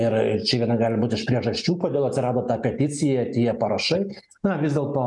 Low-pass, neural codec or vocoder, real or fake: 10.8 kHz; none; real